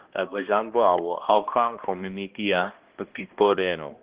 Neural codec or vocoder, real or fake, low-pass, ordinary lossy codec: codec, 16 kHz, 1 kbps, X-Codec, HuBERT features, trained on balanced general audio; fake; 3.6 kHz; Opus, 16 kbps